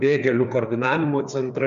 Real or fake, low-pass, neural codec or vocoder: fake; 7.2 kHz; codec, 16 kHz, 4 kbps, FreqCodec, larger model